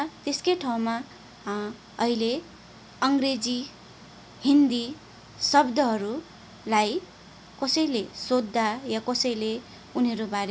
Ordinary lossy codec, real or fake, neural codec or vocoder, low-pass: none; real; none; none